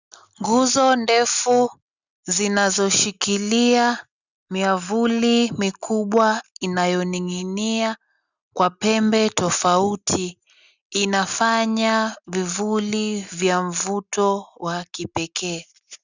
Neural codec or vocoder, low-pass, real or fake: none; 7.2 kHz; real